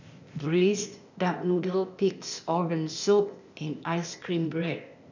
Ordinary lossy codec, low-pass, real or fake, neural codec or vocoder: none; 7.2 kHz; fake; codec, 16 kHz, 0.8 kbps, ZipCodec